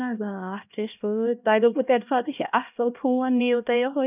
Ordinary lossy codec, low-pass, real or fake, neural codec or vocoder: none; 3.6 kHz; fake; codec, 16 kHz, 1 kbps, X-Codec, HuBERT features, trained on LibriSpeech